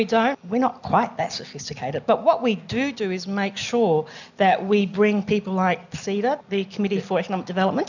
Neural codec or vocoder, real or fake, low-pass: none; real; 7.2 kHz